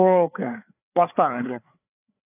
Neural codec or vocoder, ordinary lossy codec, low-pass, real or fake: codec, 16 kHz, 4 kbps, FreqCodec, larger model; none; 3.6 kHz; fake